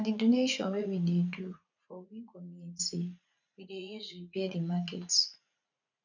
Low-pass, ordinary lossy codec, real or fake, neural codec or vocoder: 7.2 kHz; none; fake; vocoder, 44.1 kHz, 80 mel bands, Vocos